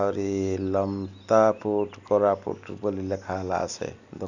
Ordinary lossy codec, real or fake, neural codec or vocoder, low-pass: none; real; none; 7.2 kHz